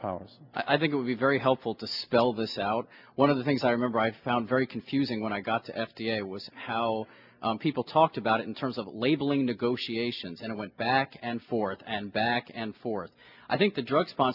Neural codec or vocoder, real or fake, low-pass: none; real; 5.4 kHz